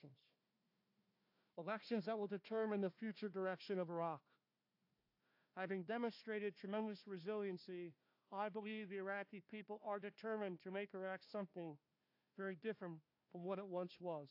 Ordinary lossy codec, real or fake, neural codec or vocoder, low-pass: AAC, 48 kbps; fake; codec, 16 kHz, 1 kbps, FunCodec, trained on Chinese and English, 50 frames a second; 5.4 kHz